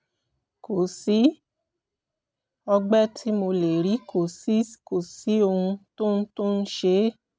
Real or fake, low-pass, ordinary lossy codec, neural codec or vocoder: real; none; none; none